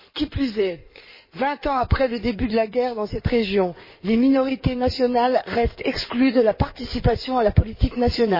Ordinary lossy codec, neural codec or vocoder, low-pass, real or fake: MP3, 24 kbps; codec, 16 kHz in and 24 kHz out, 2.2 kbps, FireRedTTS-2 codec; 5.4 kHz; fake